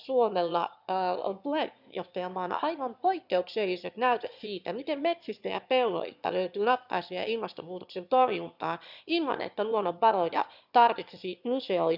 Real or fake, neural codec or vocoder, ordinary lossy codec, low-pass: fake; autoencoder, 22.05 kHz, a latent of 192 numbers a frame, VITS, trained on one speaker; none; 5.4 kHz